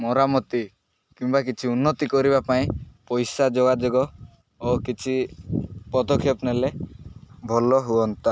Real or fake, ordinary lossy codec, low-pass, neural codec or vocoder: real; none; none; none